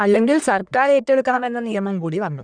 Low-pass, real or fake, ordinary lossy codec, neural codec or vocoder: 9.9 kHz; fake; none; codec, 44.1 kHz, 1.7 kbps, Pupu-Codec